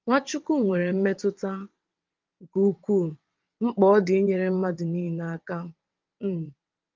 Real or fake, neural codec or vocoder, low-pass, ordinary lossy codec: fake; vocoder, 22.05 kHz, 80 mel bands, WaveNeXt; 7.2 kHz; Opus, 32 kbps